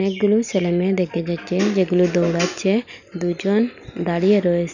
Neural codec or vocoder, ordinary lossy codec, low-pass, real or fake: none; none; 7.2 kHz; real